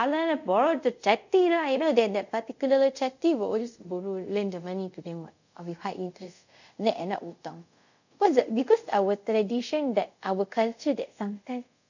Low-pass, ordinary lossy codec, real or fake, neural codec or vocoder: 7.2 kHz; none; fake; codec, 24 kHz, 0.5 kbps, DualCodec